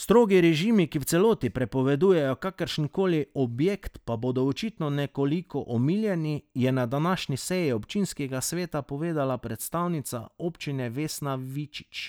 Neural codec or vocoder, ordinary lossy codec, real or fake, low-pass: none; none; real; none